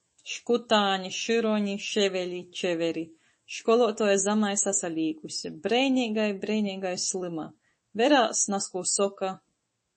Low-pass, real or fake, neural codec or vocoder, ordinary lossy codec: 10.8 kHz; fake; autoencoder, 48 kHz, 128 numbers a frame, DAC-VAE, trained on Japanese speech; MP3, 32 kbps